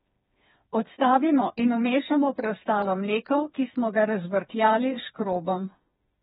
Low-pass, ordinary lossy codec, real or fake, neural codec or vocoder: 7.2 kHz; AAC, 16 kbps; fake; codec, 16 kHz, 4 kbps, FreqCodec, smaller model